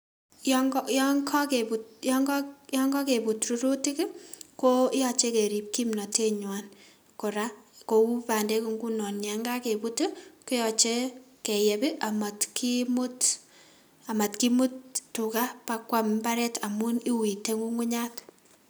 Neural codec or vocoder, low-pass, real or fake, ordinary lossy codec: none; none; real; none